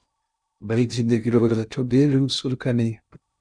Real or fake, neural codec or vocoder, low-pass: fake; codec, 16 kHz in and 24 kHz out, 0.6 kbps, FocalCodec, streaming, 2048 codes; 9.9 kHz